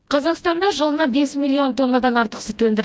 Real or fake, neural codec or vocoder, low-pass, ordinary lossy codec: fake; codec, 16 kHz, 1 kbps, FreqCodec, smaller model; none; none